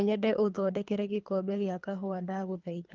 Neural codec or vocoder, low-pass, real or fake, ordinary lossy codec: codec, 24 kHz, 3 kbps, HILCodec; 7.2 kHz; fake; Opus, 32 kbps